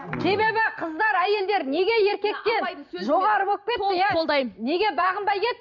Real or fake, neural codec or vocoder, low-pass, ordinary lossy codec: fake; vocoder, 44.1 kHz, 128 mel bands every 256 samples, BigVGAN v2; 7.2 kHz; none